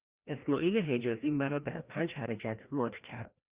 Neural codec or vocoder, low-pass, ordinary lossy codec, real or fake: codec, 16 kHz, 1 kbps, FreqCodec, larger model; 3.6 kHz; Opus, 64 kbps; fake